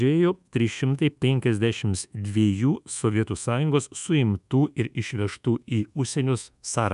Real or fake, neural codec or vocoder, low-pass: fake; codec, 24 kHz, 1.2 kbps, DualCodec; 10.8 kHz